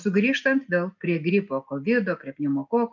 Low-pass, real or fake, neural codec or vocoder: 7.2 kHz; real; none